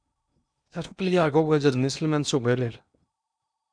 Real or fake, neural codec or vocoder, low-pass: fake; codec, 16 kHz in and 24 kHz out, 0.8 kbps, FocalCodec, streaming, 65536 codes; 9.9 kHz